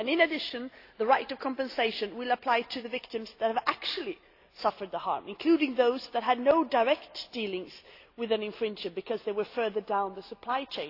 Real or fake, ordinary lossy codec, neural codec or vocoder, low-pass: real; AAC, 32 kbps; none; 5.4 kHz